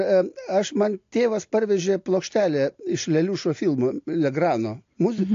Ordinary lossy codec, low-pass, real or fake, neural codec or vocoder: AAC, 48 kbps; 7.2 kHz; real; none